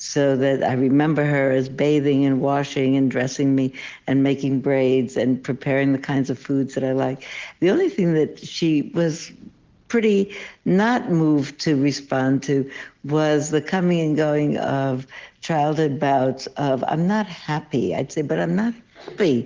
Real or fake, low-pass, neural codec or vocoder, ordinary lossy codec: real; 7.2 kHz; none; Opus, 16 kbps